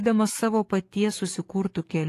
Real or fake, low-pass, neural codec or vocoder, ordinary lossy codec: fake; 14.4 kHz; codec, 44.1 kHz, 7.8 kbps, DAC; AAC, 48 kbps